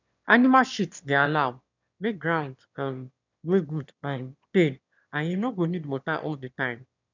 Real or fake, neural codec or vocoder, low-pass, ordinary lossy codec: fake; autoencoder, 22.05 kHz, a latent of 192 numbers a frame, VITS, trained on one speaker; 7.2 kHz; none